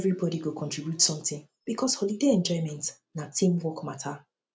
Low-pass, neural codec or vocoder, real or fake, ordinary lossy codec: none; none; real; none